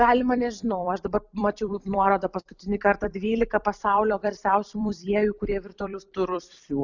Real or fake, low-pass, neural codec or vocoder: fake; 7.2 kHz; vocoder, 44.1 kHz, 128 mel bands every 256 samples, BigVGAN v2